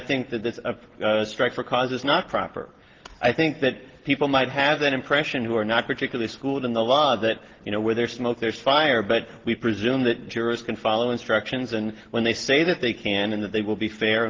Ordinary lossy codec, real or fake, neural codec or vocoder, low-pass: Opus, 16 kbps; real; none; 7.2 kHz